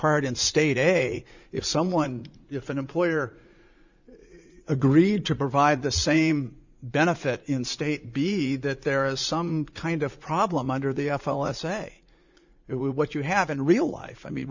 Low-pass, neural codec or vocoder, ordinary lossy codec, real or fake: 7.2 kHz; vocoder, 44.1 kHz, 80 mel bands, Vocos; Opus, 64 kbps; fake